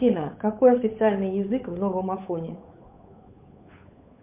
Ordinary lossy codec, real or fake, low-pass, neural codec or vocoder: MP3, 32 kbps; fake; 3.6 kHz; codec, 16 kHz, 8 kbps, FunCodec, trained on Chinese and English, 25 frames a second